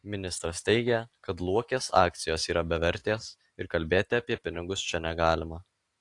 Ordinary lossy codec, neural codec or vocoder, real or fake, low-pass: AAC, 48 kbps; none; real; 10.8 kHz